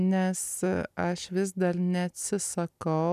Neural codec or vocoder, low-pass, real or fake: none; 14.4 kHz; real